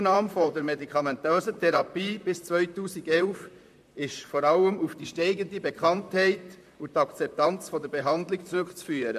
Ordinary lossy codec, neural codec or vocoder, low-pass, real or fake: MP3, 64 kbps; vocoder, 44.1 kHz, 128 mel bands, Pupu-Vocoder; 14.4 kHz; fake